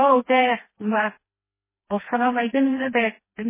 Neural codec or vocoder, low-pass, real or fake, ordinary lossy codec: codec, 16 kHz, 2 kbps, FreqCodec, smaller model; 3.6 kHz; fake; MP3, 16 kbps